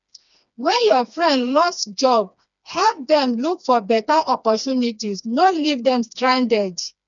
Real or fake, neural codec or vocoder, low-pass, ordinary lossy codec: fake; codec, 16 kHz, 2 kbps, FreqCodec, smaller model; 7.2 kHz; none